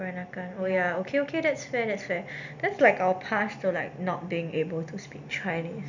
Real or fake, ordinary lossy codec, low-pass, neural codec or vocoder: real; none; 7.2 kHz; none